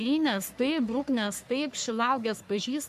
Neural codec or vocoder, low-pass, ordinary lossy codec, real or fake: codec, 44.1 kHz, 3.4 kbps, Pupu-Codec; 14.4 kHz; MP3, 96 kbps; fake